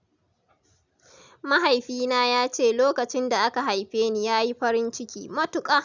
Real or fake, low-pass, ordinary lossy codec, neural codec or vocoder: real; 7.2 kHz; none; none